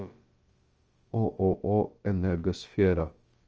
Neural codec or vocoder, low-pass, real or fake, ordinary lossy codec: codec, 16 kHz, about 1 kbps, DyCAST, with the encoder's durations; 7.2 kHz; fake; Opus, 24 kbps